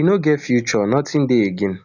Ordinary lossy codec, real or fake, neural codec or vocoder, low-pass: none; real; none; 7.2 kHz